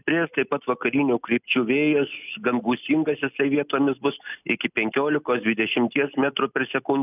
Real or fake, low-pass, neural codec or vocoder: real; 3.6 kHz; none